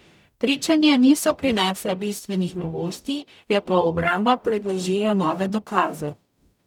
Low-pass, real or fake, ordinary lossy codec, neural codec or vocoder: 19.8 kHz; fake; none; codec, 44.1 kHz, 0.9 kbps, DAC